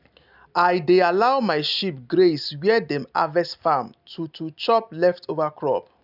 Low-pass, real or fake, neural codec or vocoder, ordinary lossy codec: 5.4 kHz; real; none; none